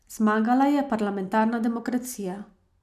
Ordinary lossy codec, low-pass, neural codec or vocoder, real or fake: none; 14.4 kHz; none; real